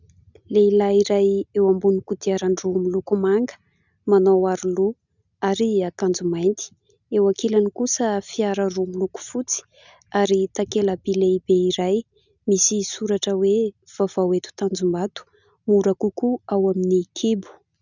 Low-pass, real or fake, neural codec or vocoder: 7.2 kHz; real; none